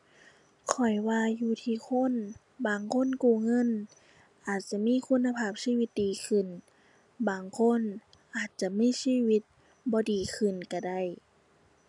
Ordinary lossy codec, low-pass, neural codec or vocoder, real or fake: none; 10.8 kHz; none; real